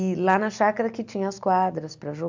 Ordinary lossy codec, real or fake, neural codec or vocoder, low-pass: MP3, 64 kbps; real; none; 7.2 kHz